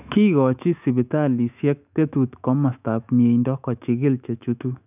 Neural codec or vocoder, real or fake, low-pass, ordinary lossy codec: autoencoder, 48 kHz, 128 numbers a frame, DAC-VAE, trained on Japanese speech; fake; 3.6 kHz; none